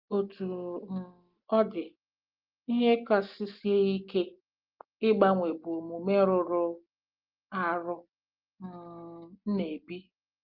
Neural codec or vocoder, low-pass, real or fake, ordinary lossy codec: none; 5.4 kHz; real; Opus, 32 kbps